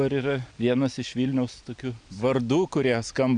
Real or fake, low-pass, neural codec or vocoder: real; 9.9 kHz; none